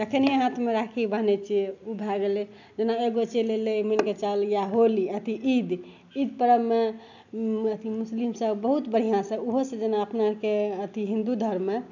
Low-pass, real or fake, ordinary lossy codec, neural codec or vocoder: 7.2 kHz; real; none; none